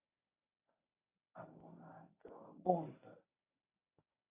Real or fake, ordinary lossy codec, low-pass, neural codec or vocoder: fake; AAC, 16 kbps; 3.6 kHz; codec, 24 kHz, 0.9 kbps, WavTokenizer, medium speech release version 1